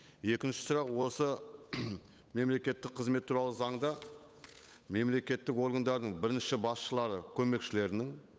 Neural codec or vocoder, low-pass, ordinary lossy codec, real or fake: codec, 16 kHz, 8 kbps, FunCodec, trained on Chinese and English, 25 frames a second; none; none; fake